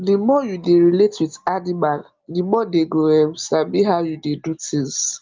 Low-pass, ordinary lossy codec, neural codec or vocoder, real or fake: 7.2 kHz; Opus, 32 kbps; none; real